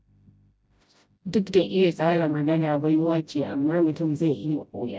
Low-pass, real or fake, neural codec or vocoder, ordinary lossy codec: none; fake; codec, 16 kHz, 0.5 kbps, FreqCodec, smaller model; none